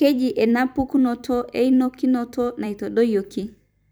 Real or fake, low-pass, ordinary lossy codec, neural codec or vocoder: fake; none; none; vocoder, 44.1 kHz, 128 mel bands every 256 samples, BigVGAN v2